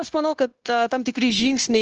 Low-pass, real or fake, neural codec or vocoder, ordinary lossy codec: 7.2 kHz; fake; codec, 16 kHz, 0.9 kbps, LongCat-Audio-Codec; Opus, 16 kbps